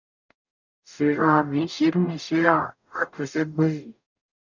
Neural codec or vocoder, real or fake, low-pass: codec, 44.1 kHz, 0.9 kbps, DAC; fake; 7.2 kHz